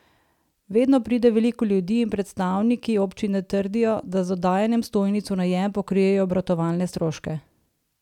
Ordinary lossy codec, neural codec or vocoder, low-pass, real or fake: none; none; 19.8 kHz; real